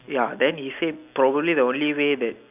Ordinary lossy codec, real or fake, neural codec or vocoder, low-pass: none; real; none; 3.6 kHz